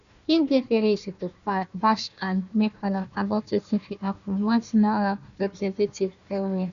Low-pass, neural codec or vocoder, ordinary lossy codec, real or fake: 7.2 kHz; codec, 16 kHz, 1 kbps, FunCodec, trained on Chinese and English, 50 frames a second; none; fake